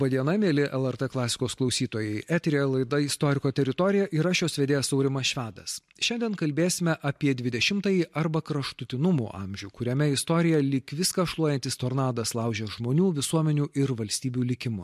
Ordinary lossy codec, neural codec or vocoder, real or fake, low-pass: MP3, 64 kbps; none; real; 14.4 kHz